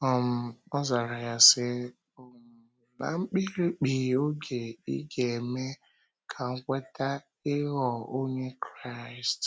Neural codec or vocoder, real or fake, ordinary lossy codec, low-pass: none; real; none; none